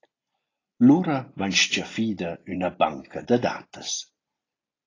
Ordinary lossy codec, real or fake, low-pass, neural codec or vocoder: AAC, 32 kbps; real; 7.2 kHz; none